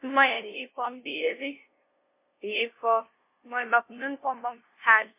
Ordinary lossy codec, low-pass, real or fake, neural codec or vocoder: MP3, 24 kbps; 3.6 kHz; fake; codec, 16 kHz, 0.5 kbps, FunCodec, trained on LibriTTS, 25 frames a second